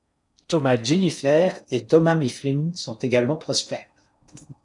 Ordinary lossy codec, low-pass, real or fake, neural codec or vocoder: MP3, 96 kbps; 10.8 kHz; fake; codec, 16 kHz in and 24 kHz out, 0.6 kbps, FocalCodec, streaming, 4096 codes